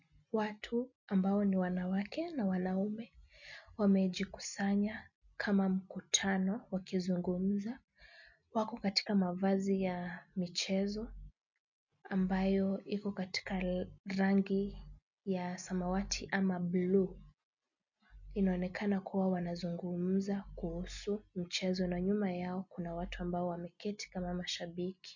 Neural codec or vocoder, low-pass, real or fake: none; 7.2 kHz; real